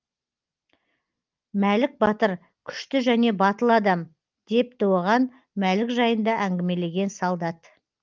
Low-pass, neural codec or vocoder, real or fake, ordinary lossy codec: 7.2 kHz; none; real; Opus, 32 kbps